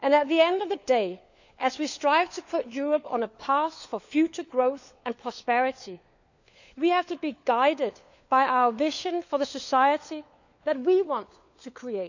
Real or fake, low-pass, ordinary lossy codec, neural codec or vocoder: fake; 7.2 kHz; none; codec, 16 kHz, 4 kbps, FunCodec, trained on LibriTTS, 50 frames a second